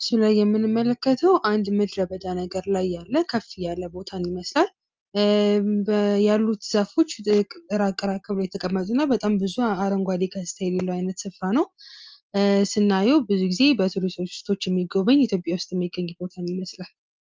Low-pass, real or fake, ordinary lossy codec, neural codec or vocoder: 7.2 kHz; real; Opus, 24 kbps; none